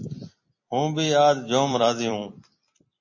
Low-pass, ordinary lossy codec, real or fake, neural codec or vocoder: 7.2 kHz; MP3, 32 kbps; fake; vocoder, 44.1 kHz, 128 mel bands every 256 samples, BigVGAN v2